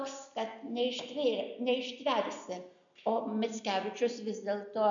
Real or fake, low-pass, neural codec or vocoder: real; 7.2 kHz; none